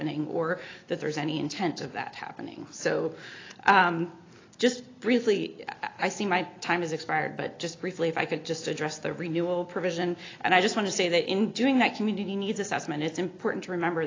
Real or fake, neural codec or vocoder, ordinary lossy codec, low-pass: real; none; AAC, 32 kbps; 7.2 kHz